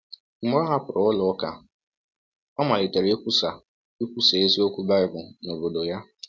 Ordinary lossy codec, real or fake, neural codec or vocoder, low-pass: none; real; none; none